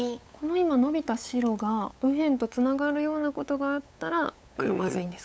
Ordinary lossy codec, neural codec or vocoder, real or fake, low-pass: none; codec, 16 kHz, 8 kbps, FunCodec, trained on LibriTTS, 25 frames a second; fake; none